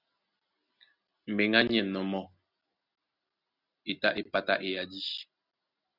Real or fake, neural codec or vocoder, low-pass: real; none; 5.4 kHz